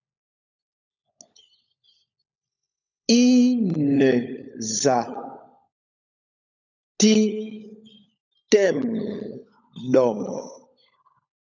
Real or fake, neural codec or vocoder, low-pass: fake; codec, 16 kHz, 16 kbps, FunCodec, trained on LibriTTS, 50 frames a second; 7.2 kHz